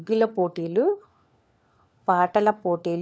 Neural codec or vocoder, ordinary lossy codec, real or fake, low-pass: codec, 16 kHz, 4 kbps, FunCodec, trained on LibriTTS, 50 frames a second; none; fake; none